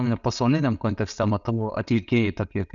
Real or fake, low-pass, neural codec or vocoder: real; 7.2 kHz; none